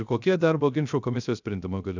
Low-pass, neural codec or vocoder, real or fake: 7.2 kHz; codec, 16 kHz, 0.3 kbps, FocalCodec; fake